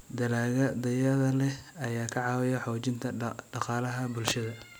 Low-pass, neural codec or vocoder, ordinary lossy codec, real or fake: none; none; none; real